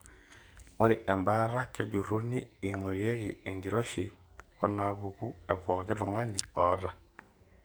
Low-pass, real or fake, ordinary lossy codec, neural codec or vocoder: none; fake; none; codec, 44.1 kHz, 2.6 kbps, SNAC